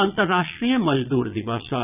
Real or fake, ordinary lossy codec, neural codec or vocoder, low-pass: fake; none; vocoder, 22.05 kHz, 80 mel bands, Vocos; 3.6 kHz